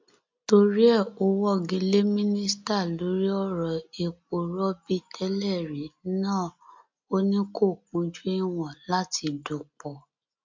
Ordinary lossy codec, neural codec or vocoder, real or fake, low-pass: MP3, 64 kbps; none; real; 7.2 kHz